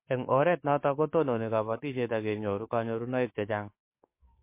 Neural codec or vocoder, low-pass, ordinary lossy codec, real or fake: codec, 44.1 kHz, 7.8 kbps, DAC; 3.6 kHz; MP3, 24 kbps; fake